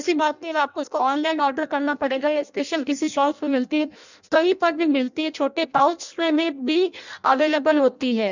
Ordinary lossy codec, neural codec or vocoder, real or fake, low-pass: none; codec, 16 kHz in and 24 kHz out, 0.6 kbps, FireRedTTS-2 codec; fake; 7.2 kHz